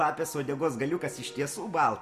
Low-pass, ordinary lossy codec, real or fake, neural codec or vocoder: 14.4 kHz; Opus, 64 kbps; real; none